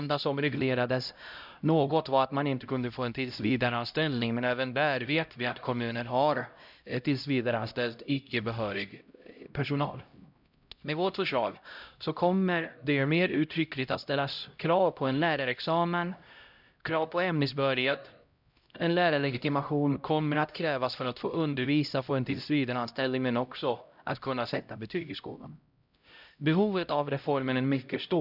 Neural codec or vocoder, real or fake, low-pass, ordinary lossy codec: codec, 16 kHz, 0.5 kbps, X-Codec, HuBERT features, trained on LibriSpeech; fake; 5.4 kHz; none